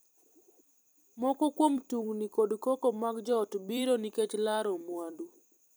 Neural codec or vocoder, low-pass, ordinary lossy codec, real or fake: vocoder, 44.1 kHz, 128 mel bands every 256 samples, BigVGAN v2; none; none; fake